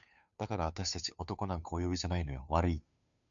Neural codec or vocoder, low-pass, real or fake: codec, 16 kHz, 2 kbps, FunCodec, trained on Chinese and English, 25 frames a second; 7.2 kHz; fake